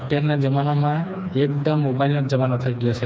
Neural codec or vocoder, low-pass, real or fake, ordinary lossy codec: codec, 16 kHz, 2 kbps, FreqCodec, smaller model; none; fake; none